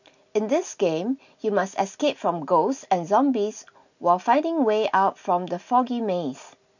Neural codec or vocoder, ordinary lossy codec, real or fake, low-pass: none; none; real; 7.2 kHz